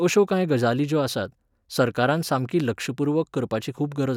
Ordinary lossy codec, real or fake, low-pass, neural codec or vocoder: none; real; 19.8 kHz; none